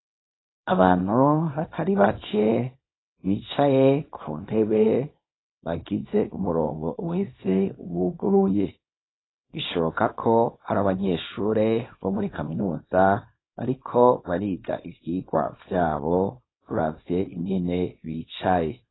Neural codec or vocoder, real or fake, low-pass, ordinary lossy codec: codec, 24 kHz, 0.9 kbps, WavTokenizer, small release; fake; 7.2 kHz; AAC, 16 kbps